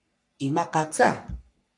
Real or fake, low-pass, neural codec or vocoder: fake; 10.8 kHz; codec, 44.1 kHz, 3.4 kbps, Pupu-Codec